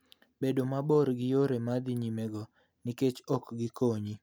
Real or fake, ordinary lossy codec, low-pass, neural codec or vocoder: real; none; none; none